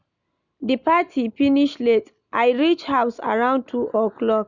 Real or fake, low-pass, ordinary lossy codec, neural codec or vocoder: real; 7.2 kHz; none; none